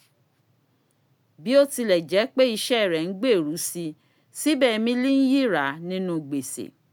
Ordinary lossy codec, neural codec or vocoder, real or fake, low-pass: none; none; real; none